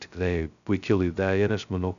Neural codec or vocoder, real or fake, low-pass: codec, 16 kHz, 0.2 kbps, FocalCodec; fake; 7.2 kHz